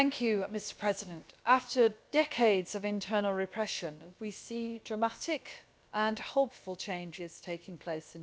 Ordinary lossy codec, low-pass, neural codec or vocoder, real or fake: none; none; codec, 16 kHz, about 1 kbps, DyCAST, with the encoder's durations; fake